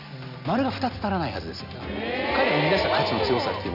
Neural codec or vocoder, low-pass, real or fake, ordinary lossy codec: none; 5.4 kHz; real; none